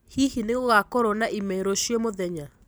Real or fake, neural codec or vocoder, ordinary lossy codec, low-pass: fake; vocoder, 44.1 kHz, 128 mel bands every 256 samples, BigVGAN v2; none; none